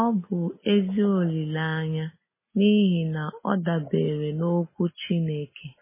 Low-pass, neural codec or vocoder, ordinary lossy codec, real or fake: 3.6 kHz; none; MP3, 16 kbps; real